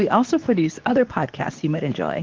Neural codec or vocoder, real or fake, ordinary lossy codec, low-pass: codec, 24 kHz, 0.9 kbps, WavTokenizer, medium speech release version 2; fake; Opus, 24 kbps; 7.2 kHz